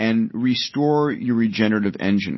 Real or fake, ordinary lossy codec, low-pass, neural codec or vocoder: real; MP3, 24 kbps; 7.2 kHz; none